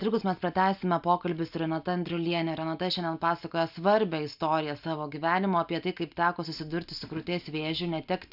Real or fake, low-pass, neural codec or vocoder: real; 5.4 kHz; none